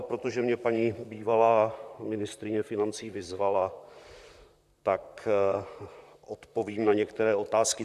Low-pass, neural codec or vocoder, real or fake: 14.4 kHz; vocoder, 44.1 kHz, 128 mel bands, Pupu-Vocoder; fake